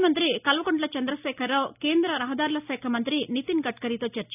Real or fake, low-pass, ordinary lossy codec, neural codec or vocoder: real; 3.6 kHz; none; none